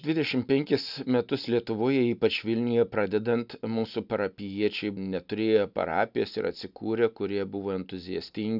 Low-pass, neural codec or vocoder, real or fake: 5.4 kHz; none; real